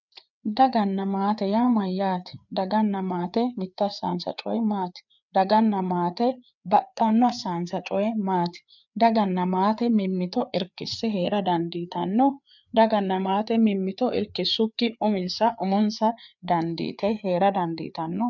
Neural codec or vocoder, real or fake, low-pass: codec, 16 kHz, 4 kbps, FreqCodec, larger model; fake; 7.2 kHz